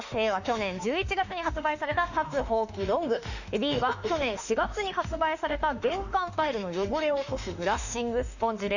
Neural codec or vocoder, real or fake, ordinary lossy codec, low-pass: autoencoder, 48 kHz, 32 numbers a frame, DAC-VAE, trained on Japanese speech; fake; none; 7.2 kHz